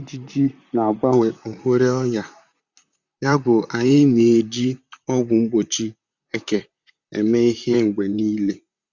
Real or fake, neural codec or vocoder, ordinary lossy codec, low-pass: fake; vocoder, 44.1 kHz, 128 mel bands, Pupu-Vocoder; Opus, 64 kbps; 7.2 kHz